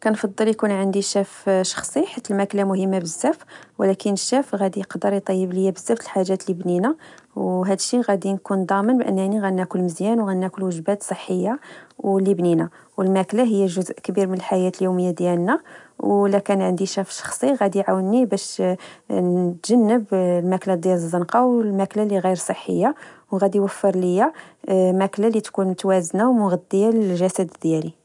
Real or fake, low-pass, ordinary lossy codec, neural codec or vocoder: real; 10.8 kHz; none; none